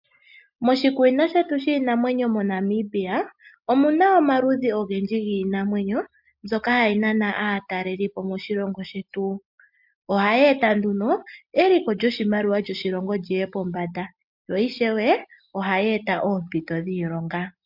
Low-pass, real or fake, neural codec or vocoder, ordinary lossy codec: 5.4 kHz; real; none; MP3, 48 kbps